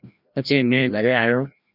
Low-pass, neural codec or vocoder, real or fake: 5.4 kHz; codec, 16 kHz, 1 kbps, FreqCodec, larger model; fake